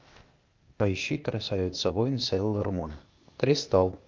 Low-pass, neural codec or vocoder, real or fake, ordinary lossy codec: 7.2 kHz; codec, 16 kHz, 0.8 kbps, ZipCodec; fake; Opus, 32 kbps